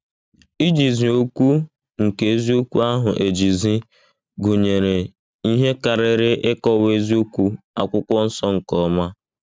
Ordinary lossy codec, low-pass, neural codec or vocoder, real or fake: none; none; none; real